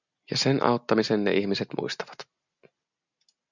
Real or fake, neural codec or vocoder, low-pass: real; none; 7.2 kHz